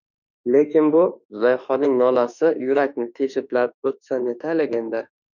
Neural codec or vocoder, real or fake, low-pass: autoencoder, 48 kHz, 32 numbers a frame, DAC-VAE, trained on Japanese speech; fake; 7.2 kHz